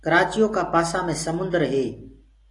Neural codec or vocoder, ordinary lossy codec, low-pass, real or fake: none; AAC, 48 kbps; 10.8 kHz; real